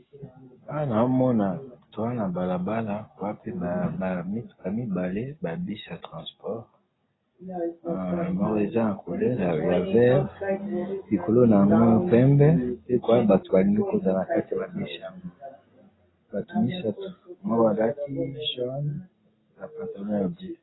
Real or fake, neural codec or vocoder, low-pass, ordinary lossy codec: real; none; 7.2 kHz; AAC, 16 kbps